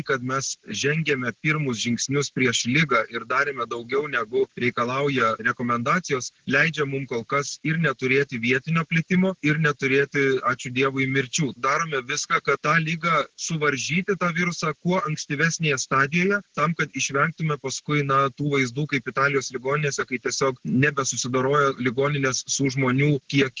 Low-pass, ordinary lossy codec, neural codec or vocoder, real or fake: 7.2 kHz; Opus, 16 kbps; none; real